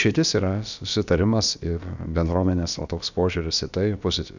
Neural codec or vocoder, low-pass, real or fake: codec, 16 kHz, about 1 kbps, DyCAST, with the encoder's durations; 7.2 kHz; fake